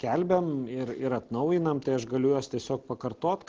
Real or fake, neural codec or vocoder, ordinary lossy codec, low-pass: real; none; Opus, 16 kbps; 7.2 kHz